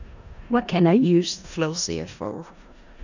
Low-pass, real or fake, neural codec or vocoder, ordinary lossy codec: 7.2 kHz; fake; codec, 16 kHz in and 24 kHz out, 0.4 kbps, LongCat-Audio-Codec, four codebook decoder; none